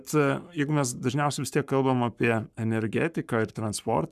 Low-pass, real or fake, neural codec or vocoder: 14.4 kHz; fake; codec, 44.1 kHz, 7.8 kbps, Pupu-Codec